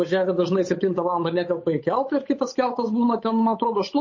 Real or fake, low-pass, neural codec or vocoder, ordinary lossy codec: fake; 7.2 kHz; codec, 16 kHz, 8 kbps, FunCodec, trained on Chinese and English, 25 frames a second; MP3, 32 kbps